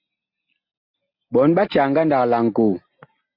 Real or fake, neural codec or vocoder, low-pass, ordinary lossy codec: real; none; 5.4 kHz; MP3, 32 kbps